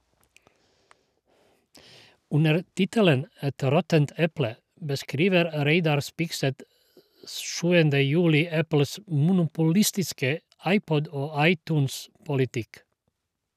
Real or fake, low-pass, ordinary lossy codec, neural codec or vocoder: real; 14.4 kHz; none; none